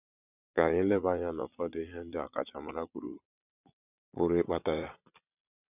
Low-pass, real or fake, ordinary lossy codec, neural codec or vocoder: 3.6 kHz; fake; none; vocoder, 22.05 kHz, 80 mel bands, Vocos